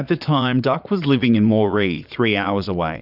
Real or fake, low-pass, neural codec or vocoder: fake; 5.4 kHz; vocoder, 22.05 kHz, 80 mel bands, Vocos